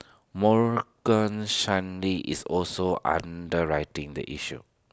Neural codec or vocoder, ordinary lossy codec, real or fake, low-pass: none; none; real; none